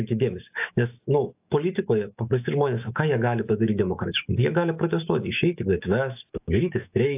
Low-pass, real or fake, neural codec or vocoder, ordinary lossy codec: 3.6 kHz; fake; autoencoder, 48 kHz, 128 numbers a frame, DAC-VAE, trained on Japanese speech; AAC, 32 kbps